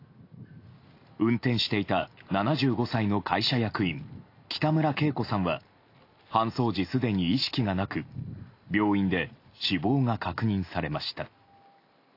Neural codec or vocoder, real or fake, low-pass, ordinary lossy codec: none; real; 5.4 kHz; AAC, 32 kbps